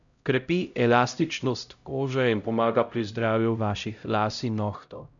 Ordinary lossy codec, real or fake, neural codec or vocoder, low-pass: none; fake; codec, 16 kHz, 0.5 kbps, X-Codec, HuBERT features, trained on LibriSpeech; 7.2 kHz